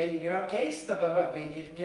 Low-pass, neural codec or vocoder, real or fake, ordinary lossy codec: 10.8 kHz; codec, 24 kHz, 0.9 kbps, WavTokenizer, medium music audio release; fake; Opus, 32 kbps